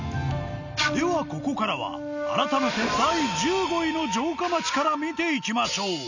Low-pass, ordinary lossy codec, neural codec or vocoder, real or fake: 7.2 kHz; none; none; real